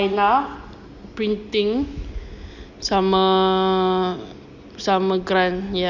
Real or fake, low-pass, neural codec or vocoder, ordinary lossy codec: real; 7.2 kHz; none; Opus, 64 kbps